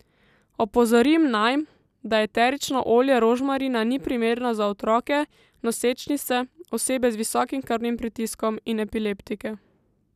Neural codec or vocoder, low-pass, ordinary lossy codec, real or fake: none; 14.4 kHz; none; real